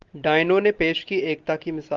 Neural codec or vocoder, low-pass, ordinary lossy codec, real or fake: none; 7.2 kHz; Opus, 32 kbps; real